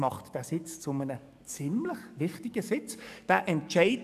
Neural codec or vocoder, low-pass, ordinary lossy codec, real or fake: codec, 44.1 kHz, 7.8 kbps, DAC; 14.4 kHz; none; fake